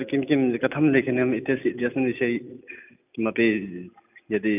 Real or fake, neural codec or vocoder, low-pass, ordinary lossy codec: real; none; 3.6 kHz; none